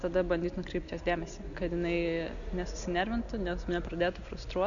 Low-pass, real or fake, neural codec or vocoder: 7.2 kHz; real; none